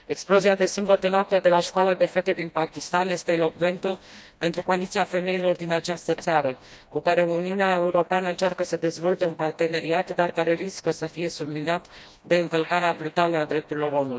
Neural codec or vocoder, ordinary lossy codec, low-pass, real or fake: codec, 16 kHz, 1 kbps, FreqCodec, smaller model; none; none; fake